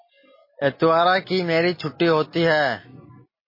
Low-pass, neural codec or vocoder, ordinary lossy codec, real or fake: 5.4 kHz; none; MP3, 24 kbps; real